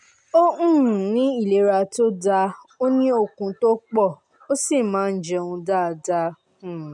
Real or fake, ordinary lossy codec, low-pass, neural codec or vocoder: real; none; 10.8 kHz; none